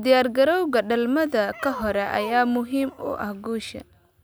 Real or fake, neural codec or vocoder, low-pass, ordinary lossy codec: real; none; none; none